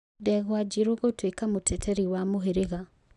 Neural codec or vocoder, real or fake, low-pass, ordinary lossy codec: none; real; 10.8 kHz; none